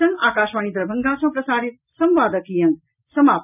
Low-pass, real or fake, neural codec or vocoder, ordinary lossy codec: 3.6 kHz; real; none; none